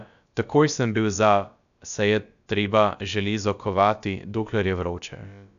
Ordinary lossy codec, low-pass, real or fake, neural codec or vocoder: none; 7.2 kHz; fake; codec, 16 kHz, about 1 kbps, DyCAST, with the encoder's durations